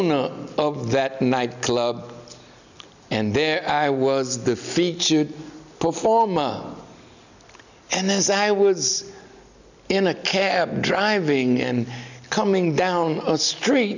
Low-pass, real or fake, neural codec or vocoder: 7.2 kHz; real; none